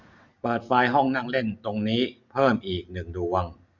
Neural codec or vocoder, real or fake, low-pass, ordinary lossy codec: none; real; 7.2 kHz; none